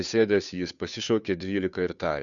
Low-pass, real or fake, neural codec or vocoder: 7.2 kHz; fake; codec, 16 kHz, 2 kbps, FunCodec, trained on Chinese and English, 25 frames a second